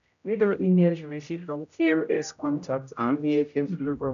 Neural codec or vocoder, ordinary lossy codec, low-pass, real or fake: codec, 16 kHz, 0.5 kbps, X-Codec, HuBERT features, trained on general audio; none; 7.2 kHz; fake